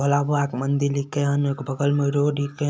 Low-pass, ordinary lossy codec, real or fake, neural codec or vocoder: none; none; real; none